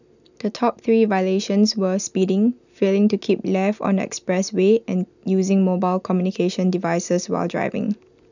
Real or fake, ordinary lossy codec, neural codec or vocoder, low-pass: real; none; none; 7.2 kHz